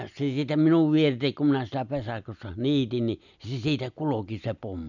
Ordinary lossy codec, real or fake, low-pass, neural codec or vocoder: none; real; 7.2 kHz; none